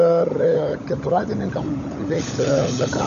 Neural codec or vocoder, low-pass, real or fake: codec, 16 kHz, 16 kbps, FunCodec, trained on LibriTTS, 50 frames a second; 7.2 kHz; fake